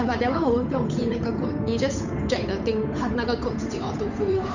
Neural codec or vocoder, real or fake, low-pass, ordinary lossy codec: codec, 16 kHz, 8 kbps, FunCodec, trained on Chinese and English, 25 frames a second; fake; 7.2 kHz; none